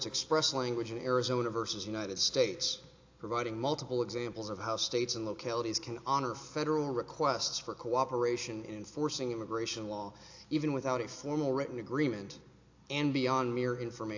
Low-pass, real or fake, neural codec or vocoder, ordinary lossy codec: 7.2 kHz; real; none; AAC, 48 kbps